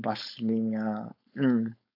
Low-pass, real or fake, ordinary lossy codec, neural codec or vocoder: 5.4 kHz; fake; AAC, 48 kbps; codec, 16 kHz, 4.8 kbps, FACodec